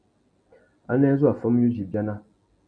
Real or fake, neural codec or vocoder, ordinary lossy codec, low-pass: real; none; MP3, 96 kbps; 9.9 kHz